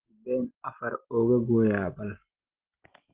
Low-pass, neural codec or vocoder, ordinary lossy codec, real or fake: 3.6 kHz; none; Opus, 16 kbps; real